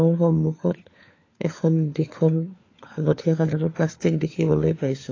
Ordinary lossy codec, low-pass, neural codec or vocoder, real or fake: AAC, 32 kbps; 7.2 kHz; codec, 16 kHz, 4 kbps, FunCodec, trained on LibriTTS, 50 frames a second; fake